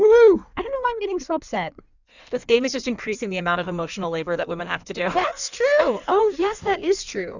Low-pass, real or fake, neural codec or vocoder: 7.2 kHz; fake; codec, 16 kHz in and 24 kHz out, 1.1 kbps, FireRedTTS-2 codec